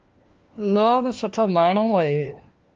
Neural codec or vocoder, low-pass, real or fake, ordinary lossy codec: codec, 16 kHz, 1 kbps, FunCodec, trained on LibriTTS, 50 frames a second; 7.2 kHz; fake; Opus, 24 kbps